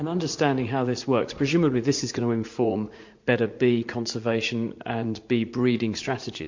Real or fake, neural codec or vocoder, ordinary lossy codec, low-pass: fake; vocoder, 44.1 kHz, 80 mel bands, Vocos; MP3, 48 kbps; 7.2 kHz